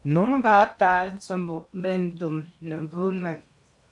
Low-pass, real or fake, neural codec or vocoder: 10.8 kHz; fake; codec, 16 kHz in and 24 kHz out, 0.8 kbps, FocalCodec, streaming, 65536 codes